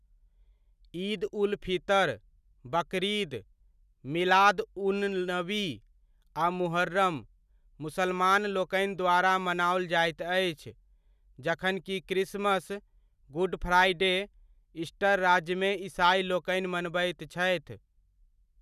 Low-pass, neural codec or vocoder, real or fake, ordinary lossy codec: 14.4 kHz; none; real; none